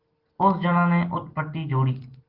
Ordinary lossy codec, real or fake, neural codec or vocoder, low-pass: Opus, 16 kbps; real; none; 5.4 kHz